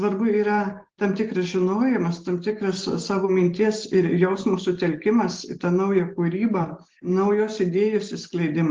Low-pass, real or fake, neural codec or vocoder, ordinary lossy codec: 7.2 kHz; real; none; Opus, 16 kbps